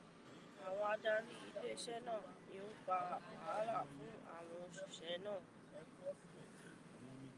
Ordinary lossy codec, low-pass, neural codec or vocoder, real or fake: Opus, 32 kbps; 10.8 kHz; none; real